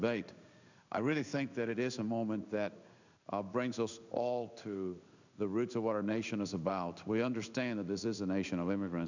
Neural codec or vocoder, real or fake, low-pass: codec, 16 kHz in and 24 kHz out, 1 kbps, XY-Tokenizer; fake; 7.2 kHz